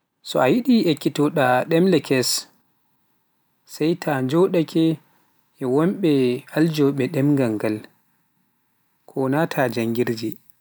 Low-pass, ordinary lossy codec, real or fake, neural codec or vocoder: none; none; real; none